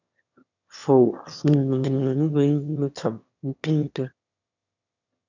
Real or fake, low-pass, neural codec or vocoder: fake; 7.2 kHz; autoencoder, 22.05 kHz, a latent of 192 numbers a frame, VITS, trained on one speaker